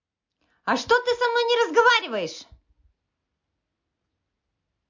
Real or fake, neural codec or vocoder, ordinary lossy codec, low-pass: real; none; MP3, 48 kbps; 7.2 kHz